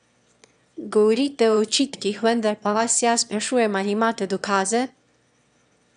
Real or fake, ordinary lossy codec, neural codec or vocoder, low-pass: fake; none; autoencoder, 22.05 kHz, a latent of 192 numbers a frame, VITS, trained on one speaker; 9.9 kHz